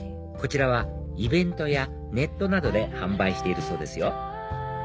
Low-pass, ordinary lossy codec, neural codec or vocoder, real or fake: none; none; none; real